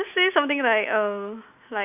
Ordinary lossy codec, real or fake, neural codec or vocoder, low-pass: none; real; none; 3.6 kHz